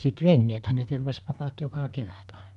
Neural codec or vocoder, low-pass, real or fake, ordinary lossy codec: codec, 24 kHz, 1 kbps, SNAC; 10.8 kHz; fake; AAC, 96 kbps